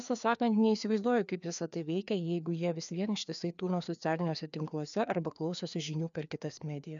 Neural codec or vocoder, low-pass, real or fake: codec, 16 kHz, 2 kbps, FreqCodec, larger model; 7.2 kHz; fake